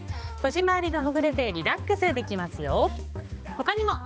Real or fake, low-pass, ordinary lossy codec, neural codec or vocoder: fake; none; none; codec, 16 kHz, 2 kbps, X-Codec, HuBERT features, trained on balanced general audio